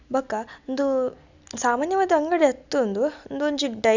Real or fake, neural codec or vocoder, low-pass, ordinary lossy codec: real; none; 7.2 kHz; none